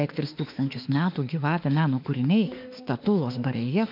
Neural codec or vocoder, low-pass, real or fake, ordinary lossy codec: autoencoder, 48 kHz, 32 numbers a frame, DAC-VAE, trained on Japanese speech; 5.4 kHz; fake; MP3, 48 kbps